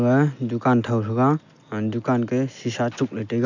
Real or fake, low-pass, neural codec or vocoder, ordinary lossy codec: real; 7.2 kHz; none; none